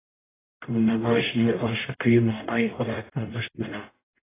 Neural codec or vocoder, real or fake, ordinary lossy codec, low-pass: codec, 44.1 kHz, 0.9 kbps, DAC; fake; AAC, 16 kbps; 3.6 kHz